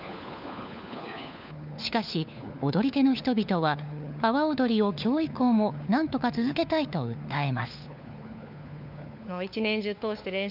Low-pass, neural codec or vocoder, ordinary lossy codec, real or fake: 5.4 kHz; codec, 16 kHz, 4 kbps, FunCodec, trained on LibriTTS, 50 frames a second; none; fake